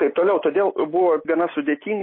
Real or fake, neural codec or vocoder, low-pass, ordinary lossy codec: real; none; 5.4 kHz; MP3, 24 kbps